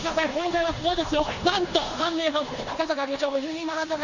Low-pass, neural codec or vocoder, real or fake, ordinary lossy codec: 7.2 kHz; codec, 16 kHz in and 24 kHz out, 0.9 kbps, LongCat-Audio-Codec, four codebook decoder; fake; none